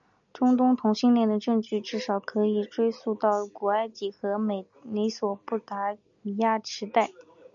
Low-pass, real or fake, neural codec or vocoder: 7.2 kHz; real; none